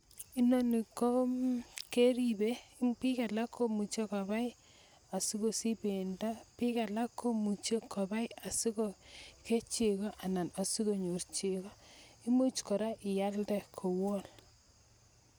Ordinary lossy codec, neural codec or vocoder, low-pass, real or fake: none; none; none; real